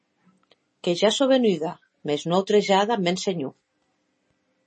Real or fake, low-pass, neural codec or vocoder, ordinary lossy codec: real; 10.8 kHz; none; MP3, 32 kbps